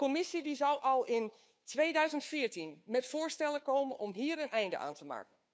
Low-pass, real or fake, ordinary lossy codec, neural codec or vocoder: none; fake; none; codec, 16 kHz, 2 kbps, FunCodec, trained on Chinese and English, 25 frames a second